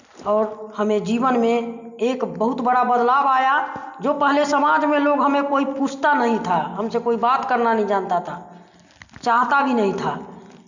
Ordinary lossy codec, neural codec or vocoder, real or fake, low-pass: none; none; real; 7.2 kHz